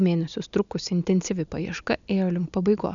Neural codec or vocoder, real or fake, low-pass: none; real; 7.2 kHz